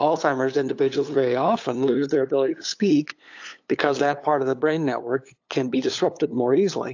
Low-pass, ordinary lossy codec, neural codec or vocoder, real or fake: 7.2 kHz; AAC, 48 kbps; codec, 16 kHz, 8 kbps, FunCodec, trained on LibriTTS, 25 frames a second; fake